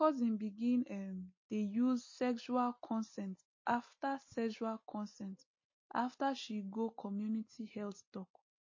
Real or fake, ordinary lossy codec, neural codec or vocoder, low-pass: real; MP3, 32 kbps; none; 7.2 kHz